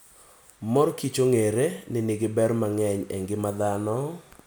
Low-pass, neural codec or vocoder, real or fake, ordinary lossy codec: none; none; real; none